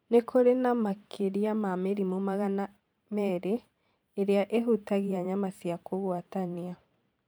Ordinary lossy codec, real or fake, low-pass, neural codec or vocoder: none; fake; none; vocoder, 44.1 kHz, 128 mel bands every 512 samples, BigVGAN v2